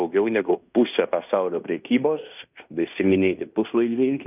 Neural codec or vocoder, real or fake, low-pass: codec, 16 kHz in and 24 kHz out, 0.9 kbps, LongCat-Audio-Codec, fine tuned four codebook decoder; fake; 3.6 kHz